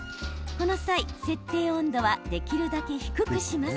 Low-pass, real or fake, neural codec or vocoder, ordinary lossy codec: none; real; none; none